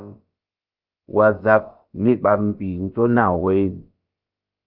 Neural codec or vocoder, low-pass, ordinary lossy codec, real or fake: codec, 16 kHz, about 1 kbps, DyCAST, with the encoder's durations; 5.4 kHz; Opus, 32 kbps; fake